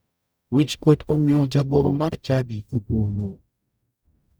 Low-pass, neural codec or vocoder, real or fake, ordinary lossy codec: none; codec, 44.1 kHz, 0.9 kbps, DAC; fake; none